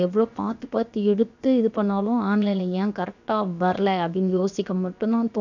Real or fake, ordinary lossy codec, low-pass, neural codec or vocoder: fake; none; 7.2 kHz; codec, 16 kHz, 0.7 kbps, FocalCodec